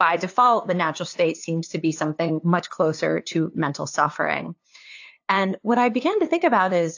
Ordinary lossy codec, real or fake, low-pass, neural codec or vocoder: AAC, 48 kbps; fake; 7.2 kHz; codec, 16 kHz, 2 kbps, FunCodec, trained on LibriTTS, 25 frames a second